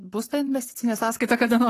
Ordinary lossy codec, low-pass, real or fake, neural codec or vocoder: AAC, 48 kbps; 14.4 kHz; fake; codec, 44.1 kHz, 7.8 kbps, Pupu-Codec